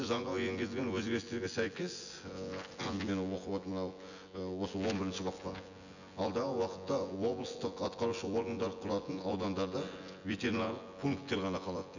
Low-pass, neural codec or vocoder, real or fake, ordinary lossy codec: 7.2 kHz; vocoder, 24 kHz, 100 mel bands, Vocos; fake; none